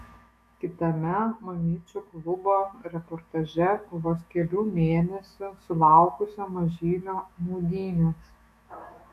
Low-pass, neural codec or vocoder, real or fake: 14.4 kHz; autoencoder, 48 kHz, 128 numbers a frame, DAC-VAE, trained on Japanese speech; fake